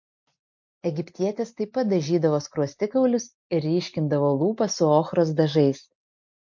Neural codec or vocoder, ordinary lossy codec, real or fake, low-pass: none; MP3, 48 kbps; real; 7.2 kHz